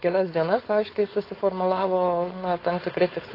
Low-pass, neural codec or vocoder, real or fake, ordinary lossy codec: 5.4 kHz; codec, 16 kHz, 4.8 kbps, FACodec; fake; AAC, 24 kbps